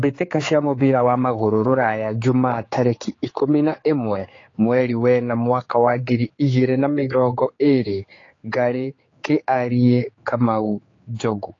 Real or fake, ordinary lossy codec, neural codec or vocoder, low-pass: fake; AAC, 32 kbps; codec, 16 kHz, 4 kbps, X-Codec, HuBERT features, trained on general audio; 7.2 kHz